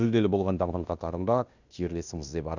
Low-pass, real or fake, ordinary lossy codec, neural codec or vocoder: 7.2 kHz; fake; none; codec, 16 kHz in and 24 kHz out, 0.9 kbps, LongCat-Audio-Codec, fine tuned four codebook decoder